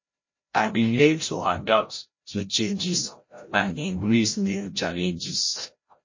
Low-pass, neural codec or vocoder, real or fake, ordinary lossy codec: 7.2 kHz; codec, 16 kHz, 0.5 kbps, FreqCodec, larger model; fake; MP3, 32 kbps